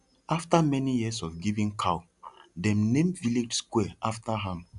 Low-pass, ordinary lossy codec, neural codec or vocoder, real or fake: 10.8 kHz; none; none; real